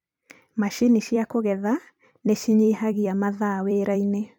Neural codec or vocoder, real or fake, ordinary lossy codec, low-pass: vocoder, 44.1 kHz, 128 mel bands every 256 samples, BigVGAN v2; fake; none; 19.8 kHz